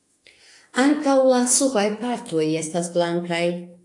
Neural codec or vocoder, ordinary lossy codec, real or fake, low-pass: autoencoder, 48 kHz, 32 numbers a frame, DAC-VAE, trained on Japanese speech; AAC, 48 kbps; fake; 10.8 kHz